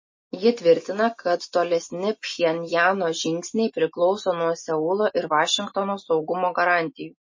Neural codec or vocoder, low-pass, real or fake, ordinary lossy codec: none; 7.2 kHz; real; MP3, 32 kbps